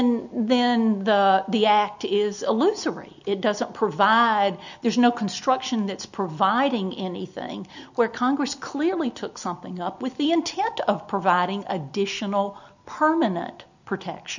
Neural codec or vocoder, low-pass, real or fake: none; 7.2 kHz; real